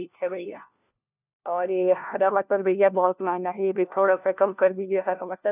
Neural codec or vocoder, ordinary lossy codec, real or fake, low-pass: codec, 16 kHz, 1 kbps, FunCodec, trained on LibriTTS, 50 frames a second; none; fake; 3.6 kHz